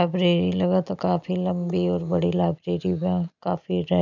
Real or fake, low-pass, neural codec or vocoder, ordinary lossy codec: real; 7.2 kHz; none; none